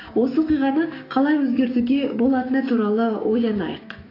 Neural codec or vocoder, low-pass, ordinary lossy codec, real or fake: codec, 16 kHz, 6 kbps, DAC; 5.4 kHz; AAC, 24 kbps; fake